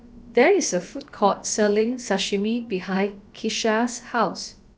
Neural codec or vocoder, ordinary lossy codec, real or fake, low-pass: codec, 16 kHz, about 1 kbps, DyCAST, with the encoder's durations; none; fake; none